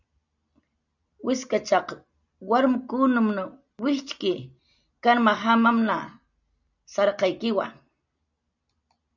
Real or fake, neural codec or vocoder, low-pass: real; none; 7.2 kHz